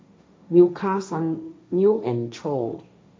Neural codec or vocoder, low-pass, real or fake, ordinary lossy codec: codec, 16 kHz, 1.1 kbps, Voila-Tokenizer; none; fake; none